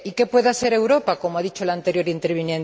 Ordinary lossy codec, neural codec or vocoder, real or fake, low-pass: none; none; real; none